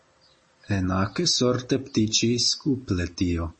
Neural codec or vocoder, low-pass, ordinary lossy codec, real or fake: none; 10.8 kHz; MP3, 32 kbps; real